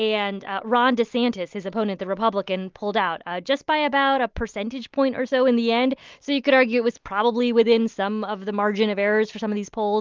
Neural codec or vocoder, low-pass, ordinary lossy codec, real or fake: none; 7.2 kHz; Opus, 32 kbps; real